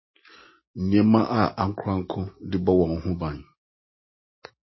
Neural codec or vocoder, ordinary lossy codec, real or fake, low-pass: none; MP3, 24 kbps; real; 7.2 kHz